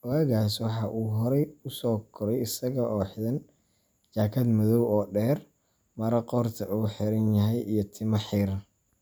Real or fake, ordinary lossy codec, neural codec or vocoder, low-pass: real; none; none; none